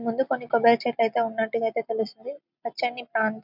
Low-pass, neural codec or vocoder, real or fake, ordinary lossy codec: 5.4 kHz; none; real; none